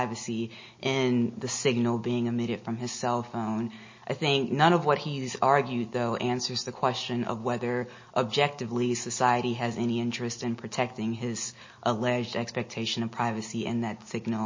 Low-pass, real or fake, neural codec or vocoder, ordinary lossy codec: 7.2 kHz; real; none; MP3, 32 kbps